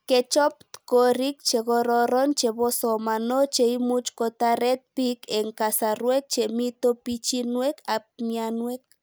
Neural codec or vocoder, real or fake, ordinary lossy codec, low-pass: none; real; none; none